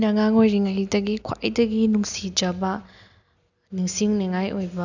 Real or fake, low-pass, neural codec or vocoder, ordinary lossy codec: real; 7.2 kHz; none; none